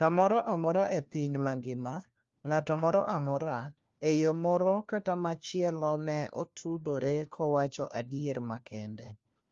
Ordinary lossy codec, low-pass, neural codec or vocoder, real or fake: Opus, 32 kbps; 7.2 kHz; codec, 16 kHz, 1 kbps, FunCodec, trained on LibriTTS, 50 frames a second; fake